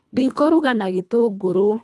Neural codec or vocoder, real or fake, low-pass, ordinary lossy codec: codec, 24 kHz, 1.5 kbps, HILCodec; fake; none; none